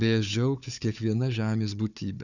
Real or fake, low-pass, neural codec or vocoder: fake; 7.2 kHz; codec, 16 kHz, 4 kbps, FunCodec, trained on Chinese and English, 50 frames a second